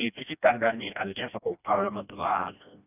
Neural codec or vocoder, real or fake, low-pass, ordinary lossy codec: codec, 16 kHz, 1 kbps, FreqCodec, smaller model; fake; 3.6 kHz; none